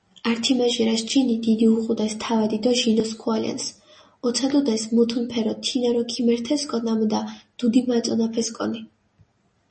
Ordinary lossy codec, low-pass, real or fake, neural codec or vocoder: MP3, 32 kbps; 10.8 kHz; real; none